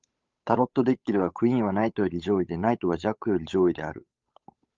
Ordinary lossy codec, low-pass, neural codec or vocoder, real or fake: Opus, 32 kbps; 7.2 kHz; codec, 16 kHz, 8 kbps, FunCodec, trained on Chinese and English, 25 frames a second; fake